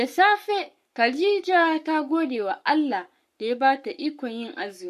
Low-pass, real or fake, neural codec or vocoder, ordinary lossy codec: 14.4 kHz; fake; codec, 44.1 kHz, 7.8 kbps, Pupu-Codec; MP3, 64 kbps